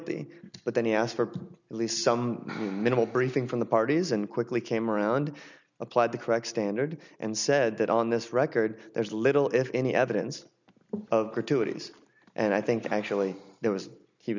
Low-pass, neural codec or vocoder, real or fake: 7.2 kHz; none; real